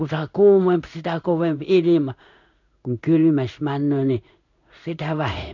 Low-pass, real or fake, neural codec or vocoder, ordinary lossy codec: 7.2 kHz; fake; codec, 16 kHz in and 24 kHz out, 1 kbps, XY-Tokenizer; none